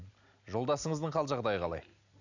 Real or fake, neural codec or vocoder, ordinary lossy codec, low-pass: real; none; none; 7.2 kHz